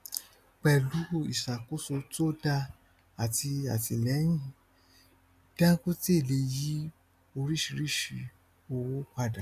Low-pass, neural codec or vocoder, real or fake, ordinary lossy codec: 14.4 kHz; none; real; none